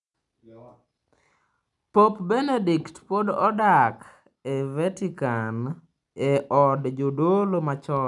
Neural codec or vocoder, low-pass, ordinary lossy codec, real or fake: none; 10.8 kHz; none; real